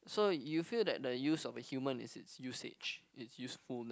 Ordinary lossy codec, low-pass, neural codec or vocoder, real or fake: none; none; none; real